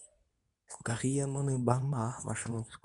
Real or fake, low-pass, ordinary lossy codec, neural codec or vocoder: fake; 10.8 kHz; none; codec, 24 kHz, 0.9 kbps, WavTokenizer, medium speech release version 2